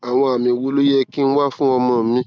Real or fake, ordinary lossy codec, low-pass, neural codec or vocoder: real; none; none; none